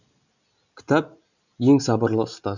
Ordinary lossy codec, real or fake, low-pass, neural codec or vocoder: none; real; 7.2 kHz; none